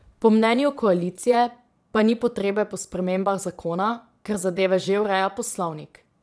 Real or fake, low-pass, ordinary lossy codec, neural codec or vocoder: fake; none; none; vocoder, 22.05 kHz, 80 mel bands, Vocos